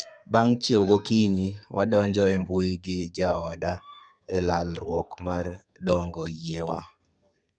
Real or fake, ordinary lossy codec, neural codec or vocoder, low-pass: fake; none; codec, 32 kHz, 1.9 kbps, SNAC; 9.9 kHz